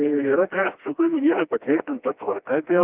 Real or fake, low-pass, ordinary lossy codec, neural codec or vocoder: fake; 3.6 kHz; Opus, 24 kbps; codec, 16 kHz, 1 kbps, FreqCodec, smaller model